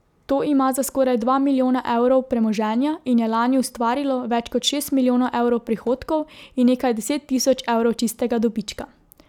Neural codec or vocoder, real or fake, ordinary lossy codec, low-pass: none; real; none; 19.8 kHz